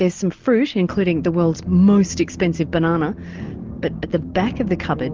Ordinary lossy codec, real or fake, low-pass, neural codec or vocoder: Opus, 32 kbps; real; 7.2 kHz; none